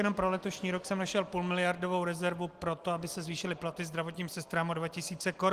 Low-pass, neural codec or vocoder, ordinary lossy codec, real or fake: 14.4 kHz; none; Opus, 24 kbps; real